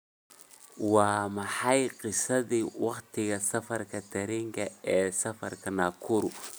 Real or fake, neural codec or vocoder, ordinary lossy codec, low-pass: real; none; none; none